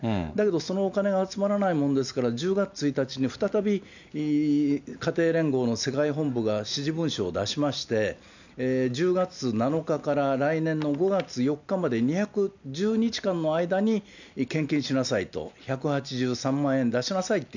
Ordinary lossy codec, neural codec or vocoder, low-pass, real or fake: none; none; 7.2 kHz; real